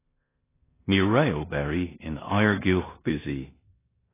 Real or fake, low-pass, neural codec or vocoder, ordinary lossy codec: fake; 3.6 kHz; codec, 16 kHz in and 24 kHz out, 0.9 kbps, LongCat-Audio-Codec, four codebook decoder; AAC, 16 kbps